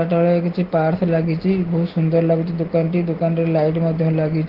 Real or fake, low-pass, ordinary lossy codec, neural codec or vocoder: real; 5.4 kHz; Opus, 16 kbps; none